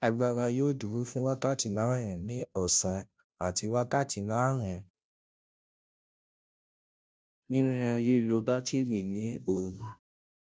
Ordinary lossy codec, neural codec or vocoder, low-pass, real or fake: none; codec, 16 kHz, 0.5 kbps, FunCodec, trained on Chinese and English, 25 frames a second; none; fake